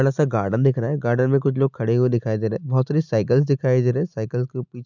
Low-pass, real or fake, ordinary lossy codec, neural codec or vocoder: 7.2 kHz; real; none; none